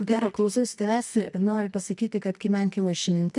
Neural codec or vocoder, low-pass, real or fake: codec, 24 kHz, 0.9 kbps, WavTokenizer, medium music audio release; 10.8 kHz; fake